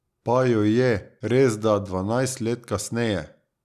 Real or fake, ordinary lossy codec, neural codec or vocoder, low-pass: real; none; none; 14.4 kHz